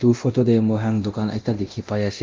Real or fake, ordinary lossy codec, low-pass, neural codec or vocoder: fake; Opus, 32 kbps; 7.2 kHz; codec, 24 kHz, 0.9 kbps, DualCodec